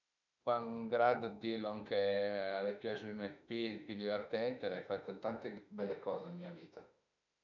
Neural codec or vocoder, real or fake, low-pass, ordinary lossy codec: autoencoder, 48 kHz, 32 numbers a frame, DAC-VAE, trained on Japanese speech; fake; 7.2 kHz; none